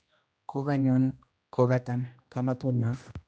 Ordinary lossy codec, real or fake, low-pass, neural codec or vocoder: none; fake; none; codec, 16 kHz, 1 kbps, X-Codec, HuBERT features, trained on general audio